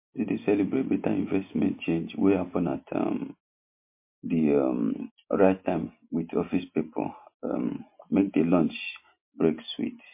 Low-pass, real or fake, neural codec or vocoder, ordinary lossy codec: 3.6 kHz; real; none; MP3, 24 kbps